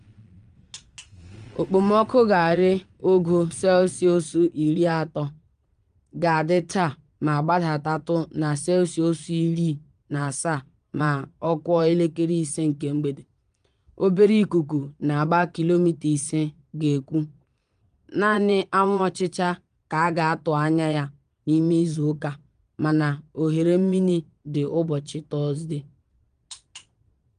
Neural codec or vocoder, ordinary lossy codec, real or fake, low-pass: vocoder, 22.05 kHz, 80 mel bands, Vocos; Opus, 32 kbps; fake; 9.9 kHz